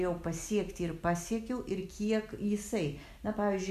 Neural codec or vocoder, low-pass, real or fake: none; 14.4 kHz; real